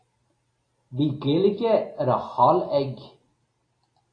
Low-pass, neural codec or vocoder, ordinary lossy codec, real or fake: 9.9 kHz; none; AAC, 32 kbps; real